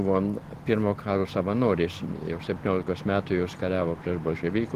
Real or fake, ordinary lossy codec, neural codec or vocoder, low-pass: real; Opus, 16 kbps; none; 14.4 kHz